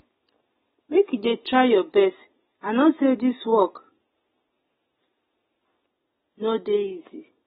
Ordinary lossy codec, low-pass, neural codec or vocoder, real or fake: AAC, 16 kbps; 7.2 kHz; none; real